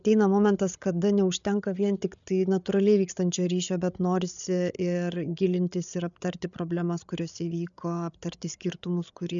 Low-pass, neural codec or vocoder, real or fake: 7.2 kHz; codec, 16 kHz, 8 kbps, FreqCodec, larger model; fake